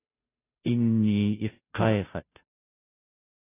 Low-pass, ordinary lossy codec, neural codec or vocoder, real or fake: 3.6 kHz; AAC, 24 kbps; codec, 16 kHz, 0.5 kbps, FunCodec, trained on Chinese and English, 25 frames a second; fake